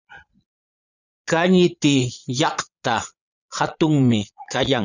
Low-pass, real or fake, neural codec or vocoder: 7.2 kHz; fake; vocoder, 22.05 kHz, 80 mel bands, Vocos